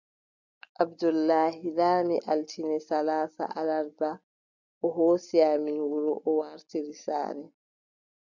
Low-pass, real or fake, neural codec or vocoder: 7.2 kHz; real; none